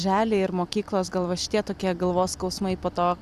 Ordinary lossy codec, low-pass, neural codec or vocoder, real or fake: Opus, 64 kbps; 14.4 kHz; vocoder, 44.1 kHz, 128 mel bands every 256 samples, BigVGAN v2; fake